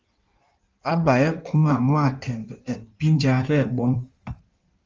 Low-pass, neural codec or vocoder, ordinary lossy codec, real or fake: 7.2 kHz; codec, 16 kHz in and 24 kHz out, 1.1 kbps, FireRedTTS-2 codec; Opus, 32 kbps; fake